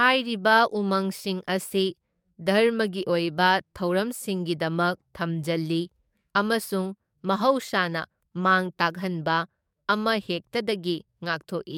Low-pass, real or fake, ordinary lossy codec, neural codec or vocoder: 19.8 kHz; fake; MP3, 96 kbps; codec, 44.1 kHz, 7.8 kbps, DAC